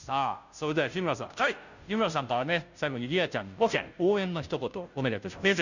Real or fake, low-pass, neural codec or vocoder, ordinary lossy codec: fake; 7.2 kHz; codec, 16 kHz, 0.5 kbps, FunCodec, trained on Chinese and English, 25 frames a second; none